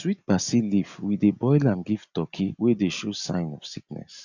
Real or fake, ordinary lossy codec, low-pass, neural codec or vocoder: real; none; 7.2 kHz; none